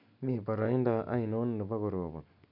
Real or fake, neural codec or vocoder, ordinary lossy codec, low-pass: real; none; MP3, 48 kbps; 5.4 kHz